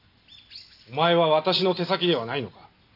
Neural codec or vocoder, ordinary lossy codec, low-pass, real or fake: none; AAC, 32 kbps; 5.4 kHz; real